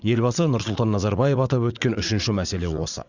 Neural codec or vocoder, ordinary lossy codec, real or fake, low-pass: vocoder, 44.1 kHz, 80 mel bands, Vocos; Opus, 64 kbps; fake; 7.2 kHz